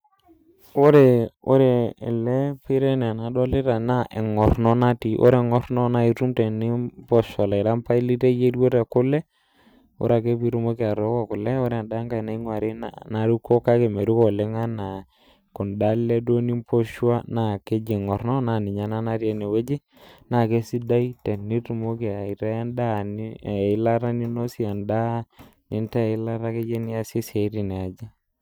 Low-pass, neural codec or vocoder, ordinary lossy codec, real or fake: none; none; none; real